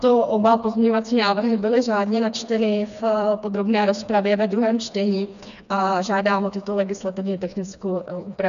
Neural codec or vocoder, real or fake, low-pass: codec, 16 kHz, 2 kbps, FreqCodec, smaller model; fake; 7.2 kHz